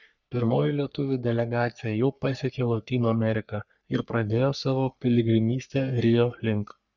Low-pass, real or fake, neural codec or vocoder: 7.2 kHz; fake; codec, 44.1 kHz, 3.4 kbps, Pupu-Codec